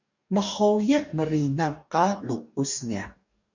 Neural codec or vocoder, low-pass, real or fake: codec, 44.1 kHz, 2.6 kbps, DAC; 7.2 kHz; fake